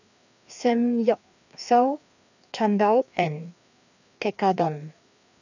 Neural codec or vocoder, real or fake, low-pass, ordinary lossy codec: codec, 16 kHz, 2 kbps, FreqCodec, larger model; fake; 7.2 kHz; none